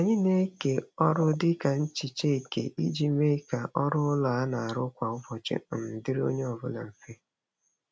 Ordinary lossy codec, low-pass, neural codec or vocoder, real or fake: none; none; none; real